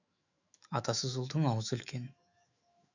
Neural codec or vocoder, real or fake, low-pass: autoencoder, 48 kHz, 128 numbers a frame, DAC-VAE, trained on Japanese speech; fake; 7.2 kHz